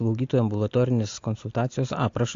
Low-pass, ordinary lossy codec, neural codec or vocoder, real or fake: 7.2 kHz; AAC, 48 kbps; none; real